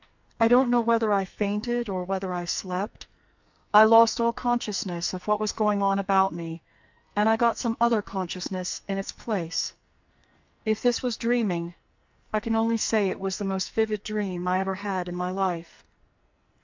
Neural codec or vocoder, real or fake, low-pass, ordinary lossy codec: codec, 44.1 kHz, 2.6 kbps, SNAC; fake; 7.2 kHz; MP3, 64 kbps